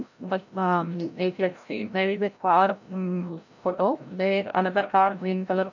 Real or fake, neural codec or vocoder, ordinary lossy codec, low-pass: fake; codec, 16 kHz, 0.5 kbps, FreqCodec, larger model; none; 7.2 kHz